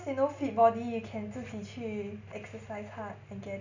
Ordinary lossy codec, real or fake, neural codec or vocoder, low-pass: none; real; none; 7.2 kHz